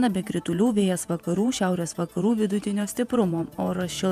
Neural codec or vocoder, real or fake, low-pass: vocoder, 48 kHz, 128 mel bands, Vocos; fake; 14.4 kHz